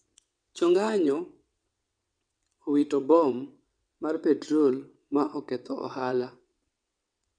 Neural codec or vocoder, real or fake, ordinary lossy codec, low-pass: vocoder, 22.05 kHz, 80 mel bands, WaveNeXt; fake; none; 9.9 kHz